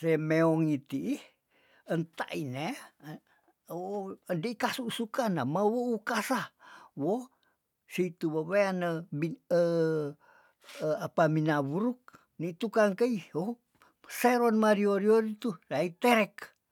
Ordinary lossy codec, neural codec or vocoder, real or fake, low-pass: none; none; real; 19.8 kHz